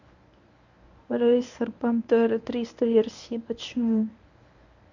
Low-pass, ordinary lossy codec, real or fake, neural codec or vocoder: 7.2 kHz; none; fake; codec, 24 kHz, 0.9 kbps, WavTokenizer, medium speech release version 1